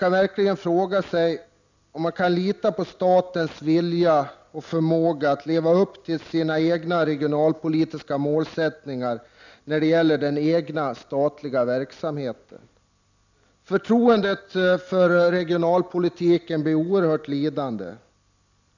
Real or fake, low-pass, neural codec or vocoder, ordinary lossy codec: real; 7.2 kHz; none; none